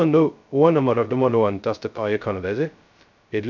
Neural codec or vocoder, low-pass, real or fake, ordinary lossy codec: codec, 16 kHz, 0.2 kbps, FocalCodec; 7.2 kHz; fake; none